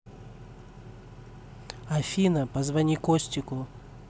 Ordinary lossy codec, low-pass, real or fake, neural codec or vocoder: none; none; real; none